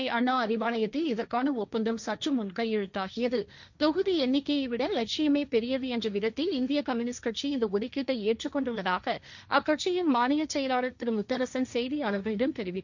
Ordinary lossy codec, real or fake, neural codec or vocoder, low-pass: none; fake; codec, 16 kHz, 1.1 kbps, Voila-Tokenizer; 7.2 kHz